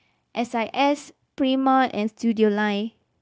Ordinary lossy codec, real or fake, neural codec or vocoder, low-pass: none; fake; codec, 16 kHz, 0.9 kbps, LongCat-Audio-Codec; none